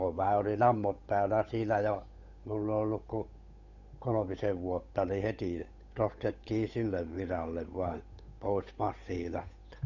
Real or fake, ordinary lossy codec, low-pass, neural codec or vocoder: fake; none; 7.2 kHz; codec, 16 kHz, 8 kbps, FunCodec, trained on Chinese and English, 25 frames a second